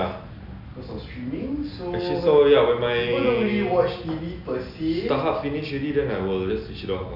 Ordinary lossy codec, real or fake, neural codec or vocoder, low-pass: none; real; none; 5.4 kHz